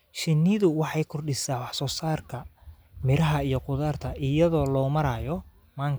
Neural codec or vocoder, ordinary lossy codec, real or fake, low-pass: none; none; real; none